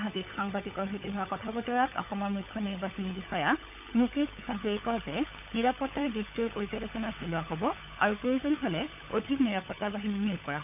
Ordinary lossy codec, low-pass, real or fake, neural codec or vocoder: none; 3.6 kHz; fake; codec, 16 kHz, 16 kbps, FunCodec, trained on LibriTTS, 50 frames a second